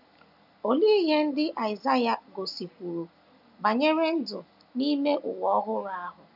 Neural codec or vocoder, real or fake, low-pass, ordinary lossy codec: none; real; 5.4 kHz; none